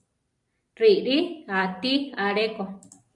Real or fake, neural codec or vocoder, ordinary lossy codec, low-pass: real; none; AAC, 64 kbps; 10.8 kHz